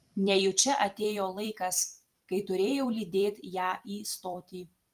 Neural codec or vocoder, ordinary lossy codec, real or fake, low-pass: vocoder, 44.1 kHz, 128 mel bands every 256 samples, BigVGAN v2; Opus, 32 kbps; fake; 14.4 kHz